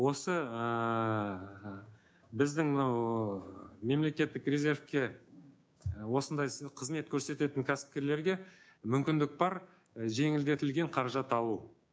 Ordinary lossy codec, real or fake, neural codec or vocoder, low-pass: none; fake; codec, 16 kHz, 6 kbps, DAC; none